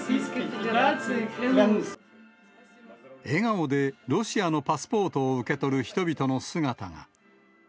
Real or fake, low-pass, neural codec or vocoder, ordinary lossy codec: real; none; none; none